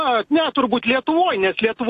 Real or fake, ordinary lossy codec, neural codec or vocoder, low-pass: real; AAC, 64 kbps; none; 14.4 kHz